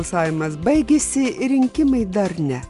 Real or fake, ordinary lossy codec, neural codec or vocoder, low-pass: real; Opus, 64 kbps; none; 10.8 kHz